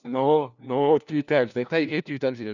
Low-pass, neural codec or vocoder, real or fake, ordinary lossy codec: 7.2 kHz; codec, 16 kHz, 1 kbps, FunCodec, trained on LibriTTS, 50 frames a second; fake; none